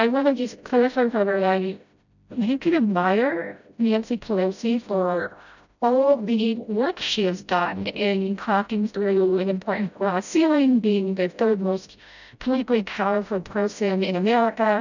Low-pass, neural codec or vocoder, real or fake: 7.2 kHz; codec, 16 kHz, 0.5 kbps, FreqCodec, smaller model; fake